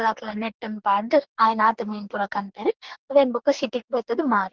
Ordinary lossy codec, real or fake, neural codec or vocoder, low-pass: Opus, 16 kbps; fake; codec, 44.1 kHz, 2.6 kbps, DAC; 7.2 kHz